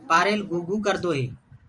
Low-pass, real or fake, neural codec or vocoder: 10.8 kHz; fake; vocoder, 44.1 kHz, 128 mel bands every 512 samples, BigVGAN v2